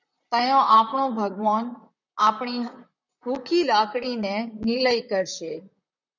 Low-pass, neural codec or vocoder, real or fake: 7.2 kHz; vocoder, 44.1 kHz, 128 mel bands, Pupu-Vocoder; fake